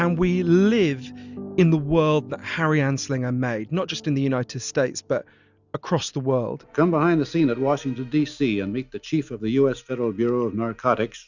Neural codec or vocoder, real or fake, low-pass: none; real; 7.2 kHz